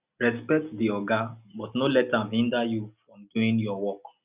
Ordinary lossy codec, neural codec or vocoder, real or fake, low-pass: Opus, 64 kbps; none; real; 3.6 kHz